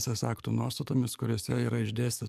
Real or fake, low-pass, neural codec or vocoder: fake; 14.4 kHz; codec, 44.1 kHz, 7.8 kbps, DAC